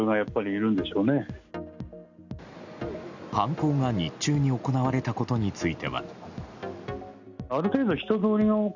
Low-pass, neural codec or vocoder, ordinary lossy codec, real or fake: 7.2 kHz; none; none; real